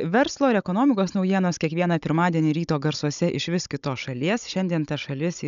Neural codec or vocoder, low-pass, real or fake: codec, 16 kHz, 16 kbps, FunCodec, trained on Chinese and English, 50 frames a second; 7.2 kHz; fake